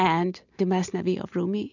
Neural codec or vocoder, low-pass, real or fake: none; 7.2 kHz; real